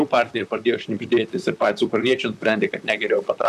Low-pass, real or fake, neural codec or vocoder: 14.4 kHz; fake; vocoder, 44.1 kHz, 128 mel bands, Pupu-Vocoder